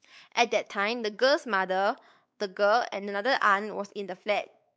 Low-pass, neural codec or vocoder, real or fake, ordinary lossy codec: none; codec, 16 kHz, 4 kbps, X-Codec, WavLM features, trained on Multilingual LibriSpeech; fake; none